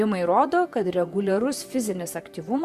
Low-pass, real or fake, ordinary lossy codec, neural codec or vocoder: 14.4 kHz; fake; Opus, 64 kbps; vocoder, 44.1 kHz, 128 mel bands, Pupu-Vocoder